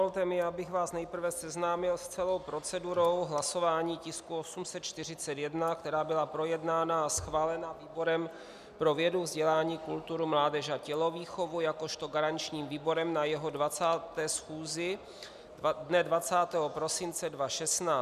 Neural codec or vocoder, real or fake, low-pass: none; real; 14.4 kHz